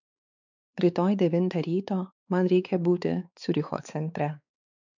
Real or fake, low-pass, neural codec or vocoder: fake; 7.2 kHz; codec, 16 kHz, 4 kbps, X-Codec, WavLM features, trained on Multilingual LibriSpeech